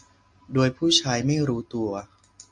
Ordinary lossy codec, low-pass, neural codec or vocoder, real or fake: MP3, 64 kbps; 10.8 kHz; none; real